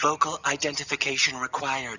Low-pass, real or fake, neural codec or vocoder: 7.2 kHz; real; none